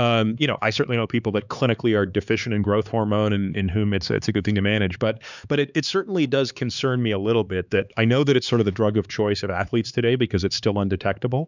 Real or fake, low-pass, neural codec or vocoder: fake; 7.2 kHz; codec, 16 kHz, 4 kbps, X-Codec, HuBERT features, trained on LibriSpeech